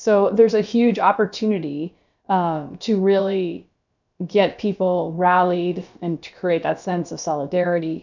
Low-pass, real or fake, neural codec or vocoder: 7.2 kHz; fake; codec, 16 kHz, about 1 kbps, DyCAST, with the encoder's durations